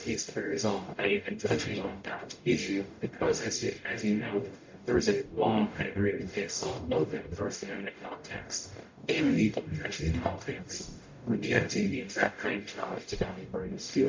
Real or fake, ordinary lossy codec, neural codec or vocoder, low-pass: fake; MP3, 48 kbps; codec, 44.1 kHz, 0.9 kbps, DAC; 7.2 kHz